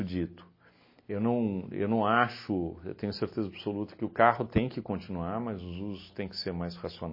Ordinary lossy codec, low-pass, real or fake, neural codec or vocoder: MP3, 24 kbps; 5.4 kHz; real; none